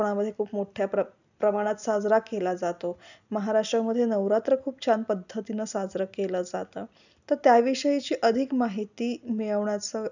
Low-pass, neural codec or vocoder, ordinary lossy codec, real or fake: 7.2 kHz; none; none; real